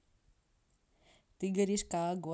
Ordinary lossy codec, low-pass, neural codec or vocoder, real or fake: none; none; none; real